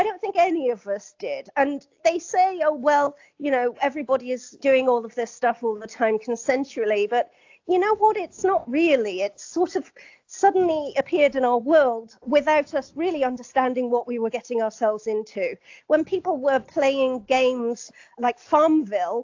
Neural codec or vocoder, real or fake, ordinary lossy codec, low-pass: none; real; AAC, 48 kbps; 7.2 kHz